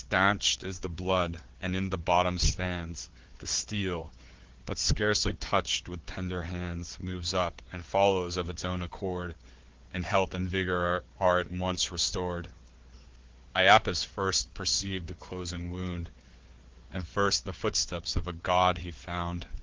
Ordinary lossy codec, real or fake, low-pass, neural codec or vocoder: Opus, 16 kbps; fake; 7.2 kHz; codec, 16 kHz, 4 kbps, FunCodec, trained on Chinese and English, 50 frames a second